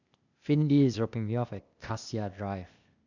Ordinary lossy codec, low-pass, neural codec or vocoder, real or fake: none; 7.2 kHz; codec, 16 kHz, 0.8 kbps, ZipCodec; fake